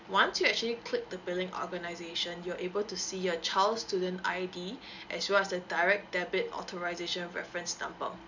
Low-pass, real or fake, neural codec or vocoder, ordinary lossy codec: 7.2 kHz; real; none; none